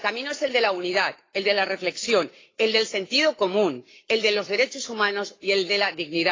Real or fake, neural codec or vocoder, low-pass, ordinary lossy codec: fake; codec, 44.1 kHz, 7.8 kbps, Pupu-Codec; 7.2 kHz; AAC, 32 kbps